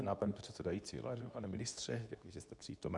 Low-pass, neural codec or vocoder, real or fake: 10.8 kHz; codec, 24 kHz, 0.9 kbps, WavTokenizer, medium speech release version 2; fake